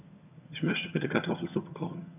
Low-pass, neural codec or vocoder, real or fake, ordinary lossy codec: 3.6 kHz; vocoder, 22.05 kHz, 80 mel bands, HiFi-GAN; fake; none